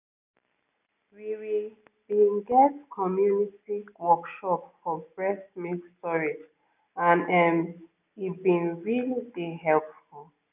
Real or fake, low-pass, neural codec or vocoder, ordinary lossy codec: real; 3.6 kHz; none; none